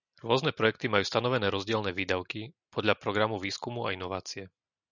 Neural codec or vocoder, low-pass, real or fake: none; 7.2 kHz; real